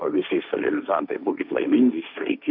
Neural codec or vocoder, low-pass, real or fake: codec, 16 kHz, 1.1 kbps, Voila-Tokenizer; 5.4 kHz; fake